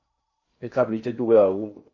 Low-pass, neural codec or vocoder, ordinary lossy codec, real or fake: 7.2 kHz; codec, 16 kHz in and 24 kHz out, 0.8 kbps, FocalCodec, streaming, 65536 codes; MP3, 32 kbps; fake